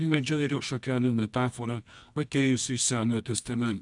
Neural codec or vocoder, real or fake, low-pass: codec, 24 kHz, 0.9 kbps, WavTokenizer, medium music audio release; fake; 10.8 kHz